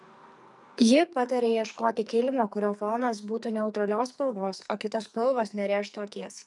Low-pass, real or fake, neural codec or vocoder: 10.8 kHz; fake; codec, 44.1 kHz, 2.6 kbps, SNAC